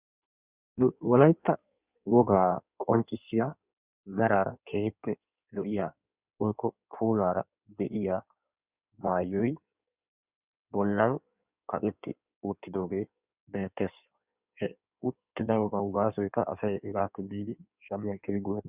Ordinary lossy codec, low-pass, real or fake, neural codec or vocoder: Opus, 64 kbps; 3.6 kHz; fake; codec, 16 kHz in and 24 kHz out, 1.1 kbps, FireRedTTS-2 codec